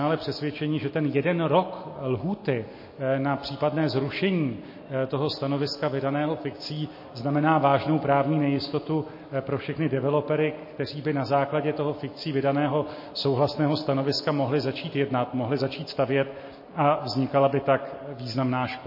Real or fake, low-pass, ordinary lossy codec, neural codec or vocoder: real; 5.4 kHz; MP3, 24 kbps; none